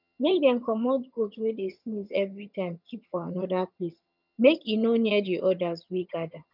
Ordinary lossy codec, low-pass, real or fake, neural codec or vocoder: none; 5.4 kHz; fake; vocoder, 22.05 kHz, 80 mel bands, HiFi-GAN